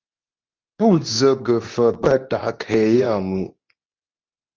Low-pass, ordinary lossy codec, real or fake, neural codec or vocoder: 7.2 kHz; Opus, 24 kbps; fake; codec, 24 kHz, 0.9 kbps, WavTokenizer, medium speech release version 2